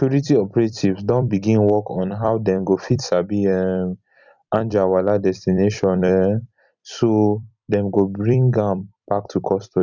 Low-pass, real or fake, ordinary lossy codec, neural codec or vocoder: 7.2 kHz; fake; none; vocoder, 44.1 kHz, 128 mel bands every 256 samples, BigVGAN v2